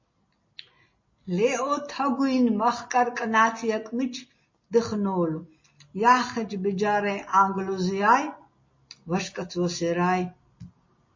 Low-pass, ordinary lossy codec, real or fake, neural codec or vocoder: 7.2 kHz; MP3, 32 kbps; real; none